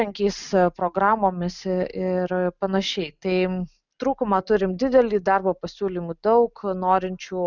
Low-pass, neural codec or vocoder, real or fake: 7.2 kHz; none; real